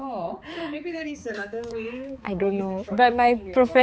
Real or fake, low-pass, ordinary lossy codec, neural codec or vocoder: fake; none; none; codec, 16 kHz, 4 kbps, X-Codec, HuBERT features, trained on balanced general audio